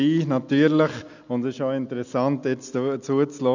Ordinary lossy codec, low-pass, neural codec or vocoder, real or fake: none; 7.2 kHz; none; real